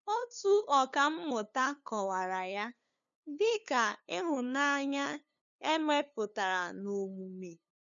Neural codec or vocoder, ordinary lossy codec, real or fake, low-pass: codec, 16 kHz, 2 kbps, FunCodec, trained on LibriTTS, 25 frames a second; none; fake; 7.2 kHz